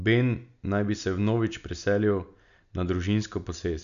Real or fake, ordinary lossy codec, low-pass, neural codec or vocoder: real; none; 7.2 kHz; none